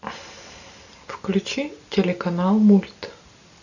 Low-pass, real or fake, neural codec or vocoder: 7.2 kHz; real; none